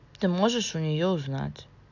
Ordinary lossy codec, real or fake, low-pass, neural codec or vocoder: none; real; 7.2 kHz; none